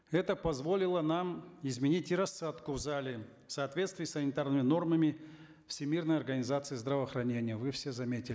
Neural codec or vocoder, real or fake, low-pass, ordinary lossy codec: none; real; none; none